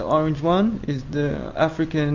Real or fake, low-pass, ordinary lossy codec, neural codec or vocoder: real; 7.2 kHz; MP3, 64 kbps; none